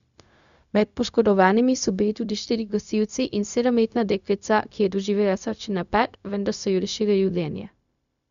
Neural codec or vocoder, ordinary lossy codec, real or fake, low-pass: codec, 16 kHz, 0.4 kbps, LongCat-Audio-Codec; none; fake; 7.2 kHz